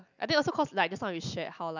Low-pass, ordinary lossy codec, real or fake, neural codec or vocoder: 7.2 kHz; none; real; none